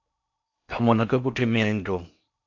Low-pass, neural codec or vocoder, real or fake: 7.2 kHz; codec, 16 kHz in and 24 kHz out, 0.6 kbps, FocalCodec, streaming, 4096 codes; fake